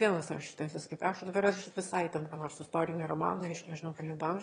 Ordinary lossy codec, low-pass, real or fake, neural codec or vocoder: AAC, 32 kbps; 9.9 kHz; fake; autoencoder, 22.05 kHz, a latent of 192 numbers a frame, VITS, trained on one speaker